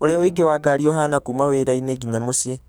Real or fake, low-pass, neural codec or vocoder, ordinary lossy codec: fake; none; codec, 44.1 kHz, 2.6 kbps, SNAC; none